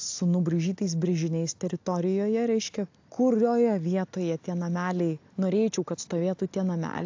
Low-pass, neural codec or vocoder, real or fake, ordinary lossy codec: 7.2 kHz; none; real; AAC, 48 kbps